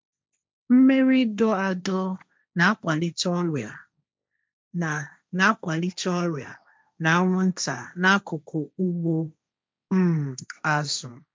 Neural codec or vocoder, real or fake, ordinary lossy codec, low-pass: codec, 16 kHz, 1.1 kbps, Voila-Tokenizer; fake; none; none